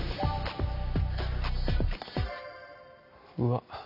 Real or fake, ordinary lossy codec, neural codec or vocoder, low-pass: real; none; none; 5.4 kHz